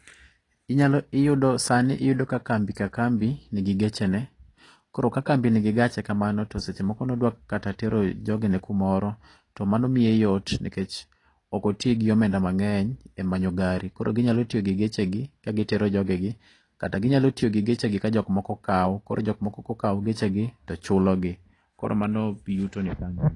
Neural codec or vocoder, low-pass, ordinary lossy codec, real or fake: none; 10.8 kHz; AAC, 32 kbps; real